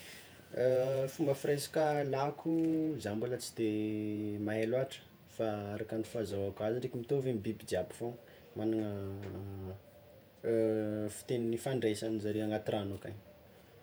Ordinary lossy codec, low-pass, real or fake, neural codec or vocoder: none; none; fake; vocoder, 48 kHz, 128 mel bands, Vocos